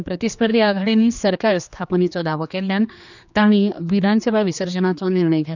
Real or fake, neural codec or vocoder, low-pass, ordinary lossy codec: fake; codec, 16 kHz, 2 kbps, X-Codec, HuBERT features, trained on general audio; 7.2 kHz; none